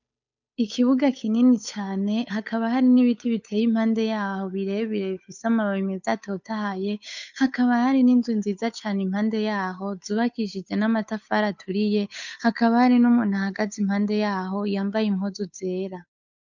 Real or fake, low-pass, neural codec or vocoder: fake; 7.2 kHz; codec, 16 kHz, 8 kbps, FunCodec, trained on Chinese and English, 25 frames a second